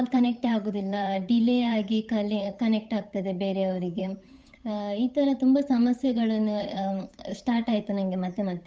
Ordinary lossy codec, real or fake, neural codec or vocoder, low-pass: none; fake; codec, 16 kHz, 8 kbps, FunCodec, trained on Chinese and English, 25 frames a second; none